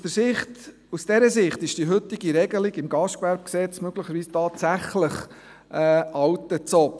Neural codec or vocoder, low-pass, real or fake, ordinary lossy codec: none; none; real; none